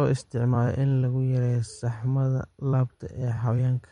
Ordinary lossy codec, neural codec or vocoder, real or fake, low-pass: MP3, 48 kbps; vocoder, 44.1 kHz, 128 mel bands every 256 samples, BigVGAN v2; fake; 19.8 kHz